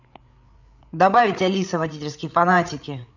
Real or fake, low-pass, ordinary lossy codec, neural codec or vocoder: fake; 7.2 kHz; none; codec, 16 kHz, 8 kbps, FreqCodec, larger model